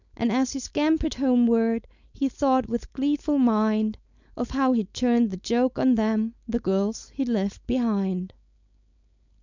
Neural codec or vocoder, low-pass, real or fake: codec, 16 kHz, 4.8 kbps, FACodec; 7.2 kHz; fake